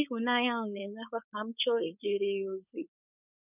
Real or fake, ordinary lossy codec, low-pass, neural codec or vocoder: fake; none; 3.6 kHz; codec, 16 kHz, 4.8 kbps, FACodec